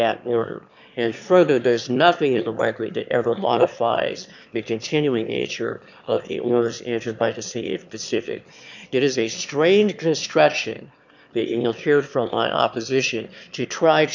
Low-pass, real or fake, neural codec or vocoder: 7.2 kHz; fake; autoencoder, 22.05 kHz, a latent of 192 numbers a frame, VITS, trained on one speaker